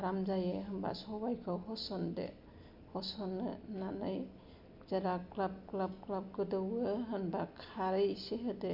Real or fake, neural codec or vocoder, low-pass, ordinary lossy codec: real; none; 5.4 kHz; MP3, 48 kbps